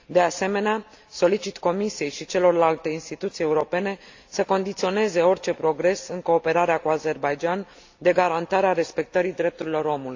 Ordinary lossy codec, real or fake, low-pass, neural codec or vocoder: AAC, 48 kbps; real; 7.2 kHz; none